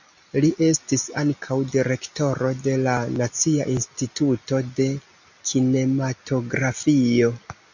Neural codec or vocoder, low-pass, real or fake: none; 7.2 kHz; real